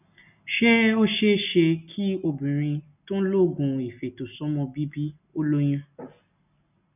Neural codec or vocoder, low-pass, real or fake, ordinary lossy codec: none; 3.6 kHz; real; none